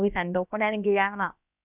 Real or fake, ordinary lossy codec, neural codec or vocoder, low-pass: fake; none; codec, 16 kHz, about 1 kbps, DyCAST, with the encoder's durations; 3.6 kHz